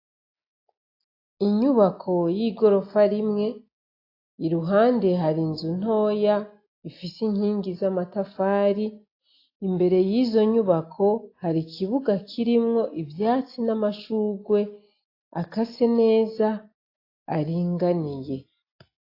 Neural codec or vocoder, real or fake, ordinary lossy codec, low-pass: none; real; AAC, 32 kbps; 5.4 kHz